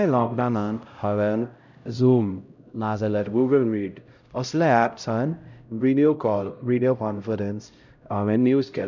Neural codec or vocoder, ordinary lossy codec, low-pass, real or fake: codec, 16 kHz, 0.5 kbps, X-Codec, HuBERT features, trained on LibriSpeech; none; 7.2 kHz; fake